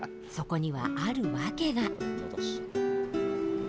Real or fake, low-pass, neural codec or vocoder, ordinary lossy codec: real; none; none; none